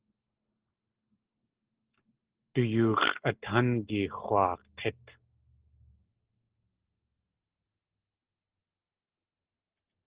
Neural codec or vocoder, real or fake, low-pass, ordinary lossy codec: none; real; 3.6 kHz; Opus, 16 kbps